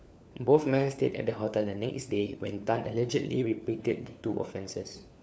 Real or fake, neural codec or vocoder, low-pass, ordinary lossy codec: fake; codec, 16 kHz, 4 kbps, FunCodec, trained on LibriTTS, 50 frames a second; none; none